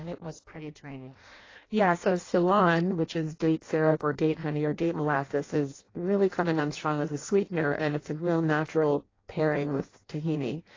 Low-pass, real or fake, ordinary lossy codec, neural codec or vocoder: 7.2 kHz; fake; AAC, 32 kbps; codec, 16 kHz in and 24 kHz out, 0.6 kbps, FireRedTTS-2 codec